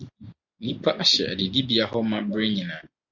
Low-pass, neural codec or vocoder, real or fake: 7.2 kHz; none; real